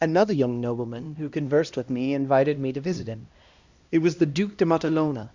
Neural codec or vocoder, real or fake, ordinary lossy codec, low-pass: codec, 16 kHz, 1 kbps, X-Codec, HuBERT features, trained on LibriSpeech; fake; Opus, 64 kbps; 7.2 kHz